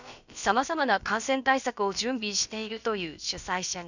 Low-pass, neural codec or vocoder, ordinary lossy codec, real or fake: 7.2 kHz; codec, 16 kHz, about 1 kbps, DyCAST, with the encoder's durations; none; fake